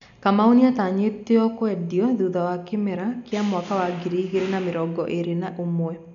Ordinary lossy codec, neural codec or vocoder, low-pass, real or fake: none; none; 7.2 kHz; real